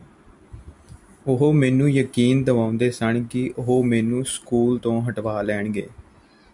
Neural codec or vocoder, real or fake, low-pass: none; real; 10.8 kHz